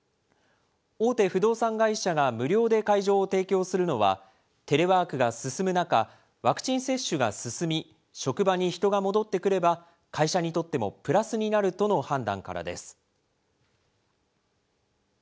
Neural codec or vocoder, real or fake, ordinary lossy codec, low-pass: none; real; none; none